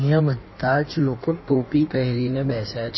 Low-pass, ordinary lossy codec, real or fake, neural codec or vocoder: 7.2 kHz; MP3, 24 kbps; fake; codec, 16 kHz in and 24 kHz out, 1.1 kbps, FireRedTTS-2 codec